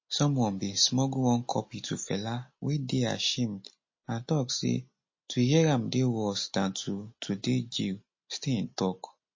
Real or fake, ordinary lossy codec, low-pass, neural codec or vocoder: real; MP3, 32 kbps; 7.2 kHz; none